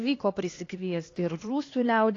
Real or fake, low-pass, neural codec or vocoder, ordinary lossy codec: fake; 7.2 kHz; codec, 16 kHz, 2 kbps, X-Codec, HuBERT features, trained on LibriSpeech; AAC, 32 kbps